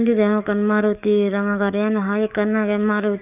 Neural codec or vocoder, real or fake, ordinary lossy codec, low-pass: codec, 44.1 kHz, 7.8 kbps, DAC; fake; none; 3.6 kHz